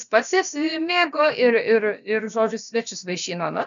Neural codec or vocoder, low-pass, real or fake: codec, 16 kHz, about 1 kbps, DyCAST, with the encoder's durations; 7.2 kHz; fake